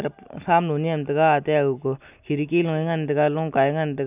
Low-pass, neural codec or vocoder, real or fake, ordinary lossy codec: 3.6 kHz; none; real; none